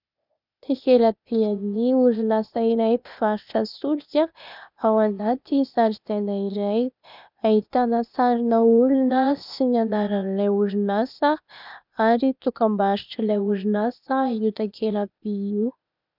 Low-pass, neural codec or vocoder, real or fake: 5.4 kHz; codec, 16 kHz, 0.8 kbps, ZipCodec; fake